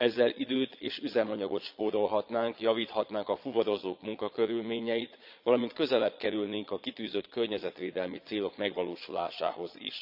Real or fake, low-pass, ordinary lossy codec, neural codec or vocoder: fake; 5.4 kHz; none; vocoder, 22.05 kHz, 80 mel bands, Vocos